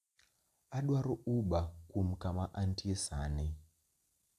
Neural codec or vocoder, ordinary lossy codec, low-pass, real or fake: none; AAC, 96 kbps; 14.4 kHz; real